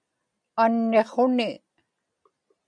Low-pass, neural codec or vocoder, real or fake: 9.9 kHz; none; real